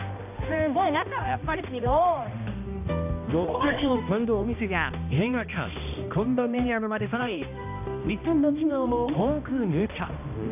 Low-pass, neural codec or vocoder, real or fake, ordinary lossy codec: 3.6 kHz; codec, 16 kHz, 1 kbps, X-Codec, HuBERT features, trained on balanced general audio; fake; none